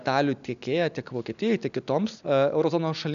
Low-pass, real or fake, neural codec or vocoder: 7.2 kHz; real; none